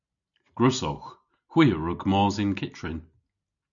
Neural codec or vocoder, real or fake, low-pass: none; real; 7.2 kHz